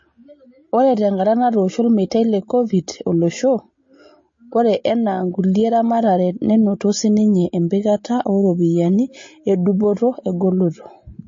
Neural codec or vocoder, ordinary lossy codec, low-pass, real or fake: none; MP3, 32 kbps; 7.2 kHz; real